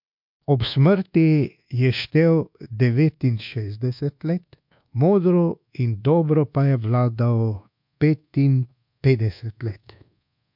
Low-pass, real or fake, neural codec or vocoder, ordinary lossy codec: 5.4 kHz; fake; codec, 24 kHz, 1.2 kbps, DualCodec; MP3, 48 kbps